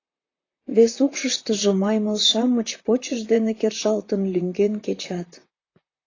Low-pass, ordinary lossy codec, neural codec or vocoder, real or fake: 7.2 kHz; AAC, 32 kbps; vocoder, 44.1 kHz, 128 mel bands, Pupu-Vocoder; fake